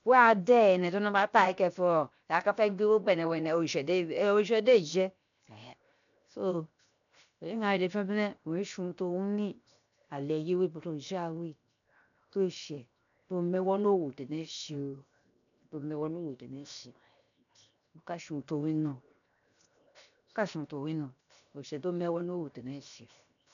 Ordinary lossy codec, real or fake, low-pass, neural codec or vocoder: none; fake; 7.2 kHz; codec, 16 kHz, 0.7 kbps, FocalCodec